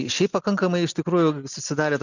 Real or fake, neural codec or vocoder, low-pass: real; none; 7.2 kHz